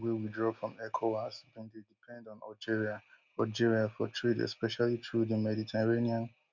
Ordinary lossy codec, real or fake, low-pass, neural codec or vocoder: none; real; 7.2 kHz; none